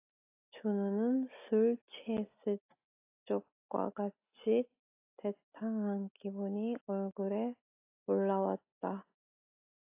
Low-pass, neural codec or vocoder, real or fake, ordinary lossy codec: 3.6 kHz; none; real; AAC, 32 kbps